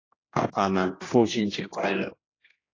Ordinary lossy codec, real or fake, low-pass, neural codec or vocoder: AAC, 32 kbps; fake; 7.2 kHz; codec, 16 kHz, 1 kbps, X-Codec, HuBERT features, trained on general audio